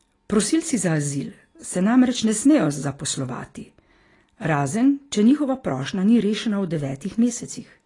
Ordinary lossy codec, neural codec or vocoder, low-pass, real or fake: AAC, 32 kbps; none; 10.8 kHz; real